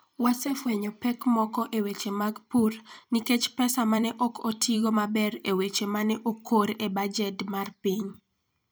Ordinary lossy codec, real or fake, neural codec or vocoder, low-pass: none; fake; vocoder, 44.1 kHz, 128 mel bands every 256 samples, BigVGAN v2; none